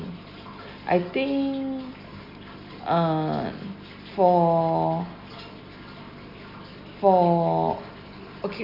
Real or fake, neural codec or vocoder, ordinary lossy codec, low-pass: real; none; none; 5.4 kHz